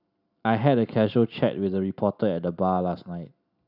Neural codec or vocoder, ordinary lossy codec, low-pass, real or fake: none; none; 5.4 kHz; real